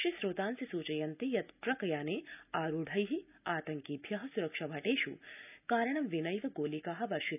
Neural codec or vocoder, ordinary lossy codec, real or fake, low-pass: none; none; real; 3.6 kHz